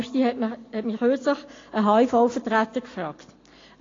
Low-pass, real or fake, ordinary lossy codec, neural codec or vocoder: 7.2 kHz; real; AAC, 32 kbps; none